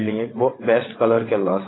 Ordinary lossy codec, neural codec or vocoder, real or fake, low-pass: AAC, 16 kbps; vocoder, 22.05 kHz, 80 mel bands, WaveNeXt; fake; 7.2 kHz